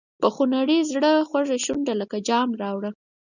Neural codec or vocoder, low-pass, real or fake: none; 7.2 kHz; real